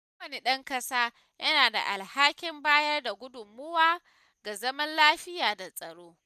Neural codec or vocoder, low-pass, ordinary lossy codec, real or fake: none; 14.4 kHz; none; real